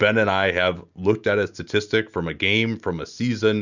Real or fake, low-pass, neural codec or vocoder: real; 7.2 kHz; none